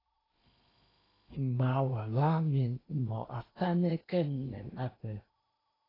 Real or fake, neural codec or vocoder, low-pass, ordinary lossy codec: fake; codec, 16 kHz in and 24 kHz out, 0.8 kbps, FocalCodec, streaming, 65536 codes; 5.4 kHz; AAC, 24 kbps